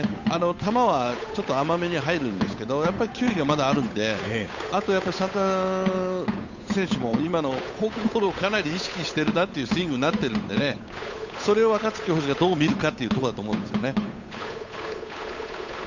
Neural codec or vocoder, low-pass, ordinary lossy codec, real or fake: codec, 16 kHz, 8 kbps, FunCodec, trained on Chinese and English, 25 frames a second; 7.2 kHz; none; fake